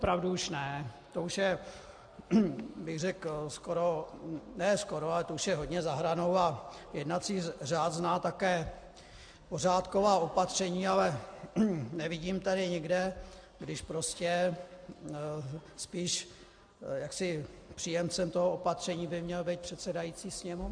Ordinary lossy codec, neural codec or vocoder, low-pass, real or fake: AAC, 64 kbps; none; 9.9 kHz; real